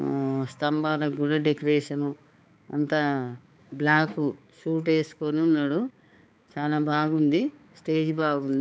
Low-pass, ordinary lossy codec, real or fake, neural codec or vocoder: none; none; fake; codec, 16 kHz, 4 kbps, X-Codec, HuBERT features, trained on balanced general audio